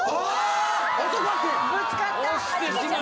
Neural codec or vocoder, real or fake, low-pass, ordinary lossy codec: none; real; none; none